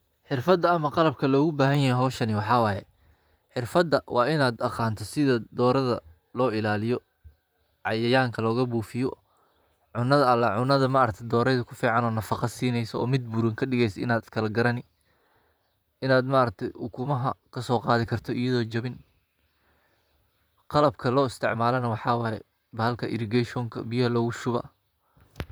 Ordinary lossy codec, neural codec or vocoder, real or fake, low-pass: none; vocoder, 44.1 kHz, 128 mel bands, Pupu-Vocoder; fake; none